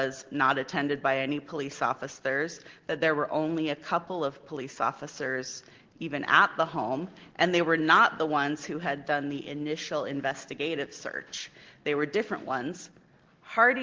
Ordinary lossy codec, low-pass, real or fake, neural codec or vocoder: Opus, 16 kbps; 7.2 kHz; real; none